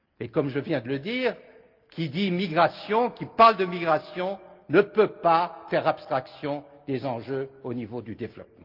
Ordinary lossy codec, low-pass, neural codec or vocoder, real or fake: Opus, 32 kbps; 5.4 kHz; none; real